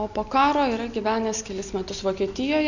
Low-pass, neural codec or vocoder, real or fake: 7.2 kHz; none; real